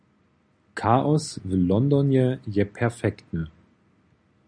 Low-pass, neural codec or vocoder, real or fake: 9.9 kHz; none; real